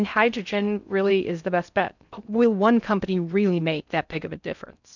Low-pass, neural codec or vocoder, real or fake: 7.2 kHz; codec, 16 kHz in and 24 kHz out, 0.6 kbps, FocalCodec, streaming, 4096 codes; fake